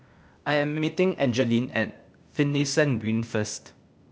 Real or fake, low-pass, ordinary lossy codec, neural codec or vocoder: fake; none; none; codec, 16 kHz, 0.8 kbps, ZipCodec